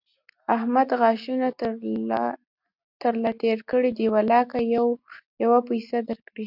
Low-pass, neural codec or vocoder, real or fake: 5.4 kHz; none; real